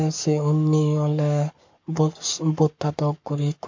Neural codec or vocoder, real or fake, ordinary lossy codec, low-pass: codec, 44.1 kHz, 7.8 kbps, Pupu-Codec; fake; MP3, 48 kbps; 7.2 kHz